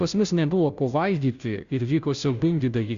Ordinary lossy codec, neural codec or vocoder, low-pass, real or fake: Opus, 64 kbps; codec, 16 kHz, 0.5 kbps, FunCodec, trained on Chinese and English, 25 frames a second; 7.2 kHz; fake